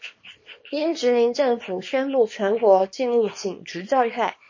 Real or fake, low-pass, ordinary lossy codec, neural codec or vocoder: fake; 7.2 kHz; MP3, 32 kbps; codec, 24 kHz, 0.9 kbps, WavTokenizer, small release